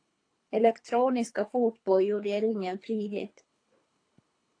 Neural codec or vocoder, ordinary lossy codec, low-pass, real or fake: codec, 24 kHz, 3 kbps, HILCodec; AAC, 48 kbps; 9.9 kHz; fake